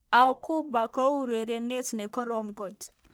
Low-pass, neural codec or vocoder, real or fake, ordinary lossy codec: none; codec, 44.1 kHz, 1.7 kbps, Pupu-Codec; fake; none